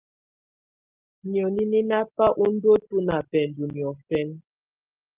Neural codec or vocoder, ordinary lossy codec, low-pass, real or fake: none; Opus, 32 kbps; 3.6 kHz; real